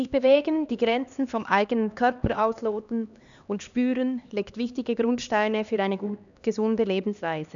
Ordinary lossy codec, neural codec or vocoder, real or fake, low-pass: none; codec, 16 kHz, 2 kbps, X-Codec, HuBERT features, trained on LibriSpeech; fake; 7.2 kHz